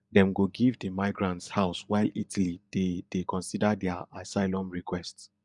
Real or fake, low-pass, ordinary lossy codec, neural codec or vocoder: fake; 10.8 kHz; none; vocoder, 24 kHz, 100 mel bands, Vocos